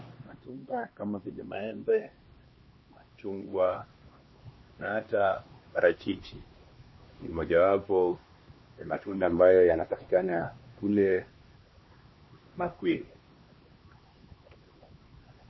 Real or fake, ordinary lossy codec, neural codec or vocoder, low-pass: fake; MP3, 24 kbps; codec, 16 kHz, 2 kbps, X-Codec, HuBERT features, trained on LibriSpeech; 7.2 kHz